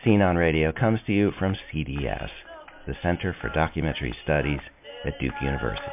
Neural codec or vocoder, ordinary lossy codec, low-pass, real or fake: none; MP3, 32 kbps; 3.6 kHz; real